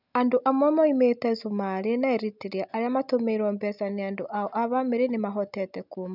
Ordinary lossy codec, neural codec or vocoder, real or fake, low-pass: none; none; real; 5.4 kHz